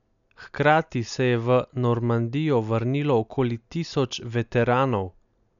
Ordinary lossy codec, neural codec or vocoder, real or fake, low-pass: none; none; real; 7.2 kHz